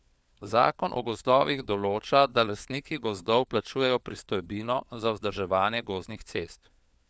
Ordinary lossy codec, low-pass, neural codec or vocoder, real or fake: none; none; codec, 16 kHz, 4 kbps, FunCodec, trained on LibriTTS, 50 frames a second; fake